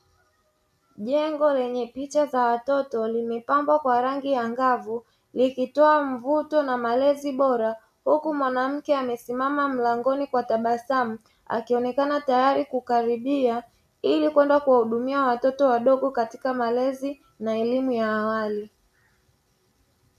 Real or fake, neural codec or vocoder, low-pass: real; none; 14.4 kHz